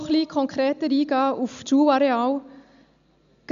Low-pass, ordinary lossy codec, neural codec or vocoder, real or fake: 7.2 kHz; none; none; real